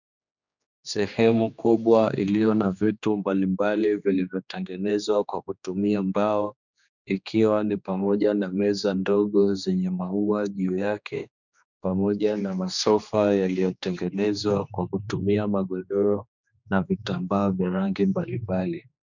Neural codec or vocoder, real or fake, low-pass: codec, 16 kHz, 2 kbps, X-Codec, HuBERT features, trained on general audio; fake; 7.2 kHz